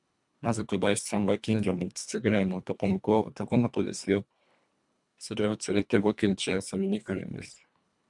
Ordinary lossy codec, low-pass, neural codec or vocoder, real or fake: MP3, 96 kbps; 10.8 kHz; codec, 24 kHz, 1.5 kbps, HILCodec; fake